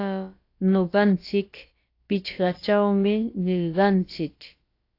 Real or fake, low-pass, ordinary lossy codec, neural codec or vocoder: fake; 5.4 kHz; AAC, 32 kbps; codec, 16 kHz, about 1 kbps, DyCAST, with the encoder's durations